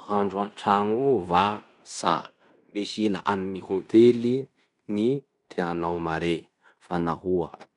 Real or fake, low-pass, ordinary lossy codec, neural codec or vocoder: fake; 10.8 kHz; none; codec, 16 kHz in and 24 kHz out, 0.9 kbps, LongCat-Audio-Codec, fine tuned four codebook decoder